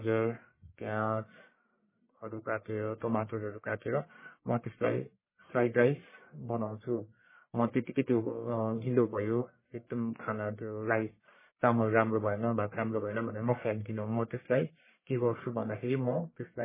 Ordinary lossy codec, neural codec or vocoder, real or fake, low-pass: MP3, 16 kbps; codec, 44.1 kHz, 1.7 kbps, Pupu-Codec; fake; 3.6 kHz